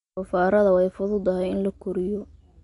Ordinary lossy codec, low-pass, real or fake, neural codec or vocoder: MP3, 64 kbps; 10.8 kHz; real; none